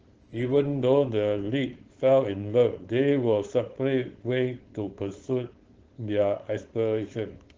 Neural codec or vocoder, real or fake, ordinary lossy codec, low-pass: codec, 16 kHz, 4.8 kbps, FACodec; fake; Opus, 16 kbps; 7.2 kHz